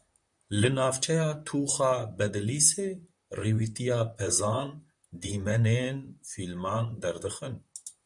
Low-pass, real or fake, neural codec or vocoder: 10.8 kHz; fake; vocoder, 44.1 kHz, 128 mel bands, Pupu-Vocoder